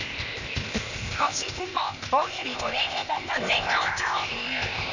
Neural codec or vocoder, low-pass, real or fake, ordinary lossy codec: codec, 16 kHz, 0.8 kbps, ZipCodec; 7.2 kHz; fake; none